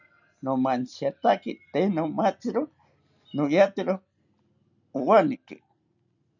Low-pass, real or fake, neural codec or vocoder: 7.2 kHz; real; none